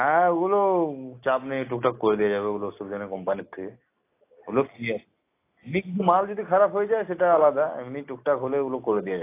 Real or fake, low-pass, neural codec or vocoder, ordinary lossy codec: real; 3.6 kHz; none; AAC, 24 kbps